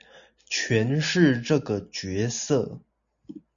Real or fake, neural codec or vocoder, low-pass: real; none; 7.2 kHz